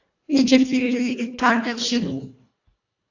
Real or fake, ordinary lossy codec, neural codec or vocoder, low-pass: fake; AAC, 48 kbps; codec, 24 kHz, 1.5 kbps, HILCodec; 7.2 kHz